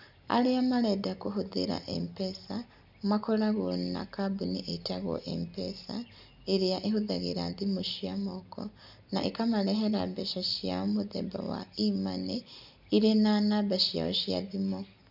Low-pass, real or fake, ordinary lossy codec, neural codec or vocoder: 5.4 kHz; real; none; none